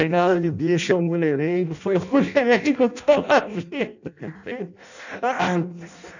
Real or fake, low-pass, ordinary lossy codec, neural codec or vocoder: fake; 7.2 kHz; none; codec, 16 kHz in and 24 kHz out, 0.6 kbps, FireRedTTS-2 codec